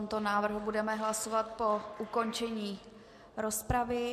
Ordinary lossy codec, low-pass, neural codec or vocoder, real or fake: MP3, 64 kbps; 14.4 kHz; none; real